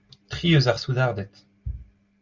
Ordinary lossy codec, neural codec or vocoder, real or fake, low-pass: Opus, 32 kbps; none; real; 7.2 kHz